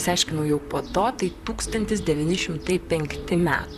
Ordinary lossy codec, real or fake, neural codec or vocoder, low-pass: AAC, 96 kbps; fake; vocoder, 44.1 kHz, 128 mel bands, Pupu-Vocoder; 14.4 kHz